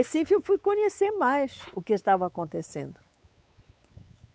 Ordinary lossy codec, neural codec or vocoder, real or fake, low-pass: none; codec, 16 kHz, 4 kbps, X-Codec, WavLM features, trained on Multilingual LibriSpeech; fake; none